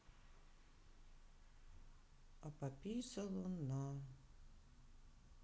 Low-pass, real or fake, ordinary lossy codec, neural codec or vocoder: none; real; none; none